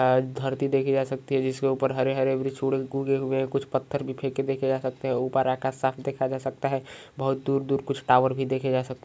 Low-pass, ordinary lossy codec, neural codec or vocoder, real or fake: none; none; none; real